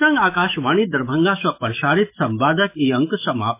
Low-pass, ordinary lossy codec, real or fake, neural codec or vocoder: 3.6 kHz; MP3, 24 kbps; fake; autoencoder, 48 kHz, 128 numbers a frame, DAC-VAE, trained on Japanese speech